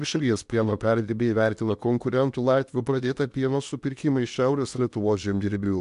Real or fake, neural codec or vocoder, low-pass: fake; codec, 16 kHz in and 24 kHz out, 0.8 kbps, FocalCodec, streaming, 65536 codes; 10.8 kHz